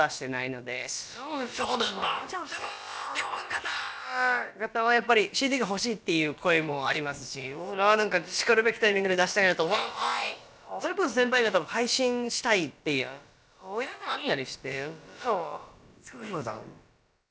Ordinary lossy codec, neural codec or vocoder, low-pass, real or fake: none; codec, 16 kHz, about 1 kbps, DyCAST, with the encoder's durations; none; fake